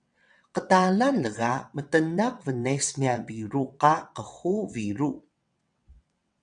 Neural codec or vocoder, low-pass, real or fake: vocoder, 22.05 kHz, 80 mel bands, WaveNeXt; 9.9 kHz; fake